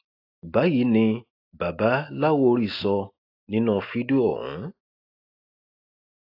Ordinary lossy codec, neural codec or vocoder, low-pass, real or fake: AAC, 32 kbps; none; 5.4 kHz; real